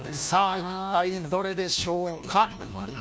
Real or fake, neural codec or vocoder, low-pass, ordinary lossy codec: fake; codec, 16 kHz, 1 kbps, FunCodec, trained on LibriTTS, 50 frames a second; none; none